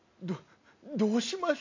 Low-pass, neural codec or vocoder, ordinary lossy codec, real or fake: 7.2 kHz; none; AAC, 48 kbps; real